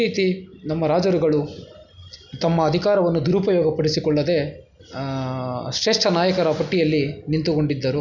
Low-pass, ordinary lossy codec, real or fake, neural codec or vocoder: 7.2 kHz; none; real; none